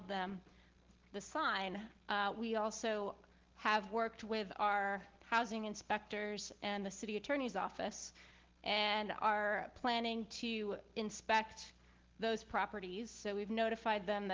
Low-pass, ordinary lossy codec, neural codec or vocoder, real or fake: 7.2 kHz; Opus, 16 kbps; none; real